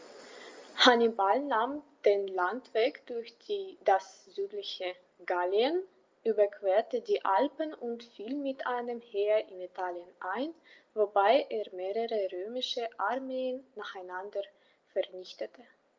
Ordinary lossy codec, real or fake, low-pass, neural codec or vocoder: Opus, 32 kbps; real; 7.2 kHz; none